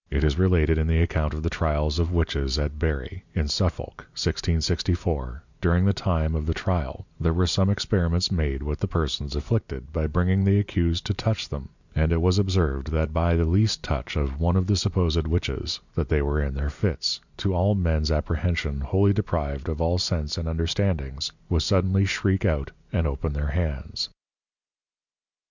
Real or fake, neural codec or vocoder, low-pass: real; none; 7.2 kHz